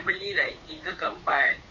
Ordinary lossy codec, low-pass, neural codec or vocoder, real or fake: MP3, 32 kbps; 7.2 kHz; codec, 16 kHz, 2 kbps, FunCodec, trained on Chinese and English, 25 frames a second; fake